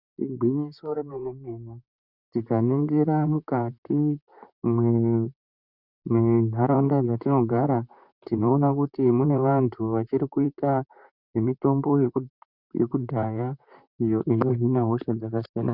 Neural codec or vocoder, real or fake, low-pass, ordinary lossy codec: vocoder, 44.1 kHz, 128 mel bands, Pupu-Vocoder; fake; 5.4 kHz; Opus, 64 kbps